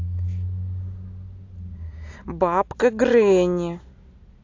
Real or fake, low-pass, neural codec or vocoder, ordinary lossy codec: real; 7.2 kHz; none; AAC, 48 kbps